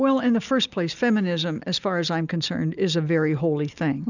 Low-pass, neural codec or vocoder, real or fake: 7.2 kHz; none; real